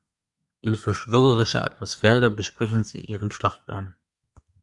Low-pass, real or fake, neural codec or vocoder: 10.8 kHz; fake; codec, 24 kHz, 1 kbps, SNAC